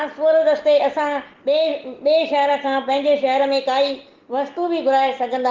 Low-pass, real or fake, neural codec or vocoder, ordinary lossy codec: 7.2 kHz; real; none; Opus, 16 kbps